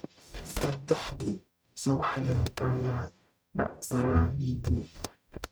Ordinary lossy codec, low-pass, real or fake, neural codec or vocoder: none; none; fake; codec, 44.1 kHz, 0.9 kbps, DAC